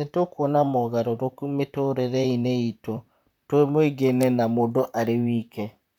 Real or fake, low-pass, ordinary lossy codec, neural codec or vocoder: fake; 19.8 kHz; none; vocoder, 44.1 kHz, 128 mel bands, Pupu-Vocoder